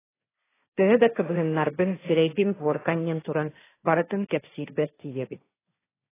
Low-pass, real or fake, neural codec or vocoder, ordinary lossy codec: 3.6 kHz; fake; codec, 16 kHz, 1.1 kbps, Voila-Tokenizer; AAC, 16 kbps